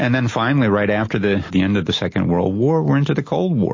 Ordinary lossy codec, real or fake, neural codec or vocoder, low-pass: MP3, 32 kbps; real; none; 7.2 kHz